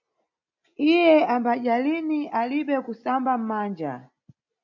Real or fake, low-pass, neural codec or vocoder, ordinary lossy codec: real; 7.2 kHz; none; MP3, 48 kbps